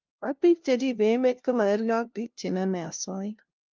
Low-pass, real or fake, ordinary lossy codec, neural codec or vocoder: 7.2 kHz; fake; Opus, 24 kbps; codec, 16 kHz, 0.5 kbps, FunCodec, trained on LibriTTS, 25 frames a second